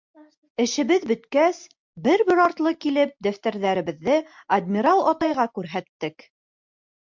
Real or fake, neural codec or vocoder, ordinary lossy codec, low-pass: real; none; MP3, 64 kbps; 7.2 kHz